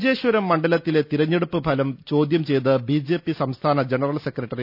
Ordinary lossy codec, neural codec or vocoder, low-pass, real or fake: none; none; 5.4 kHz; real